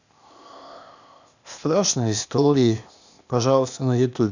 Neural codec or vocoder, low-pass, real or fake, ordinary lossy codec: codec, 16 kHz, 0.8 kbps, ZipCodec; 7.2 kHz; fake; none